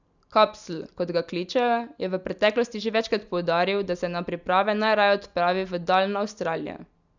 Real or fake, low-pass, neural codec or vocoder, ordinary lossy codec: real; 7.2 kHz; none; none